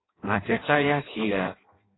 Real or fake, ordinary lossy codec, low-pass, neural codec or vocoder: fake; AAC, 16 kbps; 7.2 kHz; codec, 16 kHz in and 24 kHz out, 0.6 kbps, FireRedTTS-2 codec